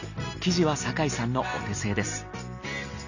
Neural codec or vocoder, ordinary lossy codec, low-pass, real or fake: none; none; 7.2 kHz; real